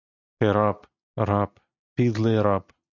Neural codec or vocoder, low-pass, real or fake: none; 7.2 kHz; real